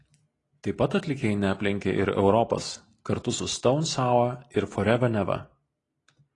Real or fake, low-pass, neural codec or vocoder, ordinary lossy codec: real; 10.8 kHz; none; AAC, 32 kbps